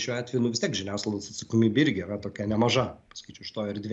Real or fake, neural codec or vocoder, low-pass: real; none; 10.8 kHz